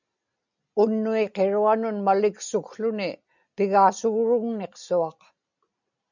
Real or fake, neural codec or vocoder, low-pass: real; none; 7.2 kHz